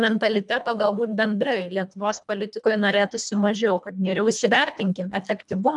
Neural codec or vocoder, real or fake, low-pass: codec, 24 kHz, 1.5 kbps, HILCodec; fake; 10.8 kHz